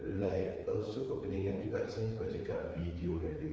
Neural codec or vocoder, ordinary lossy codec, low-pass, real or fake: codec, 16 kHz, 4 kbps, FreqCodec, larger model; none; none; fake